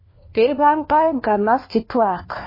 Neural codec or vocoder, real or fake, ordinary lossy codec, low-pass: codec, 16 kHz, 1 kbps, FunCodec, trained on LibriTTS, 50 frames a second; fake; MP3, 24 kbps; 5.4 kHz